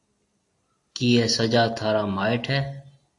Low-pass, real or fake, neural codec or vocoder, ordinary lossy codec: 10.8 kHz; real; none; AAC, 48 kbps